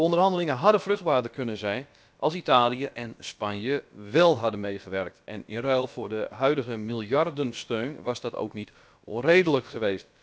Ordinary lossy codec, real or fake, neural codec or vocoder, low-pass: none; fake; codec, 16 kHz, about 1 kbps, DyCAST, with the encoder's durations; none